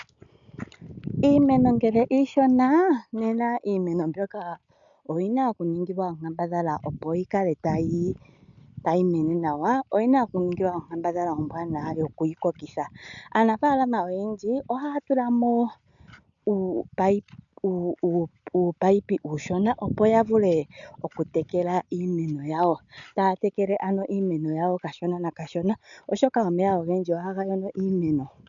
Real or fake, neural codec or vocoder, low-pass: real; none; 7.2 kHz